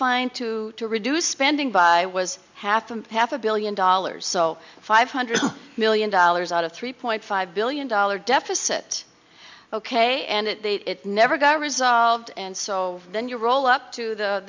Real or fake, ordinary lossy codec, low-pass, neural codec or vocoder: real; MP3, 64 kbps; 7.2 kHz; none